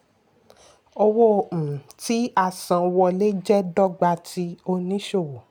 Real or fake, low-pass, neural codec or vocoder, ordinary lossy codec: real; none; none; none